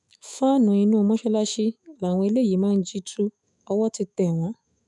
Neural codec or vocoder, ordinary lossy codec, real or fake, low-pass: autoencoder, 48 kHz, 128 numbers a frame, DAC-VAE, trained on Japanese speech; none; fake; 10.8 kHz